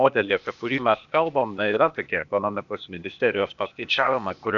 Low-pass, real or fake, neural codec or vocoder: 7.2 kHz; fake; codec, 16 kHz, 0.8 kbps, ZipCodec